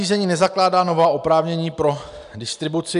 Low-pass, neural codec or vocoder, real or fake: 10.8 kHz; none; real